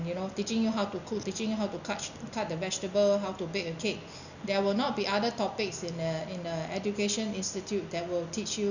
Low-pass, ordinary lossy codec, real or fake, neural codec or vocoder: 7.2 kHz; none; real; none